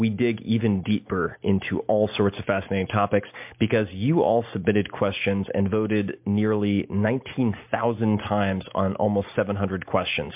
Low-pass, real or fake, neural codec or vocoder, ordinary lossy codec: 3.6 kHz; real; none; MP3, 32 kbps